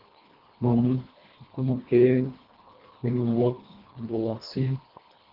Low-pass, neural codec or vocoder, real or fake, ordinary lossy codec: 5.4 kHz; codec, 24 kHz, 1.5 kbps, HILCodec; fake; Opus, 16 kbps